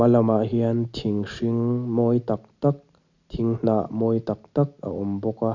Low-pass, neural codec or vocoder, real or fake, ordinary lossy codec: 7.2 kHz; none; real; none